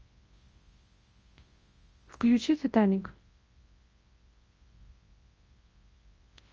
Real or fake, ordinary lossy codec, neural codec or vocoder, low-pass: fake; Opus, 24 kbps; codec, 24 kHz, 0.9 kbps, WavTokenizer, large speech release; 7.2 kHz